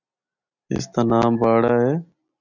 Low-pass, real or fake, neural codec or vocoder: 7.2 kHz; real; none